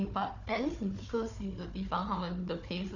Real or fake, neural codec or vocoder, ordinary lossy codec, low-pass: fake; codec, 16 kHz, 4 kbps, FunCodec, trained on Chinese and English, 50 frames a second; none; 7.2 kHz